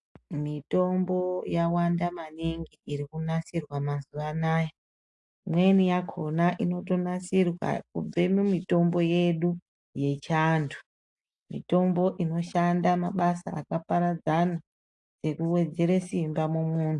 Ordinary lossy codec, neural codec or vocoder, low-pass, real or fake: AAC, 64 kbps; none; 10.8 kHz; real